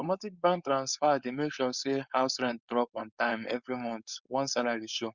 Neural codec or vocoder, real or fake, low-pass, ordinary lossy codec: codec, 16 kHz, 4.8 kbps, FACodec; fake; 7.2 kHz; Opus, 64 kbps